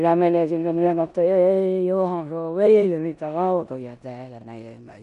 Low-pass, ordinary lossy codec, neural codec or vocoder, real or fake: 10.8 kHz; none; codec, 16 kHz in and 24 kHz out, 0.9 kbps, LongCat-Audio-Codec, four codebook decoder; fake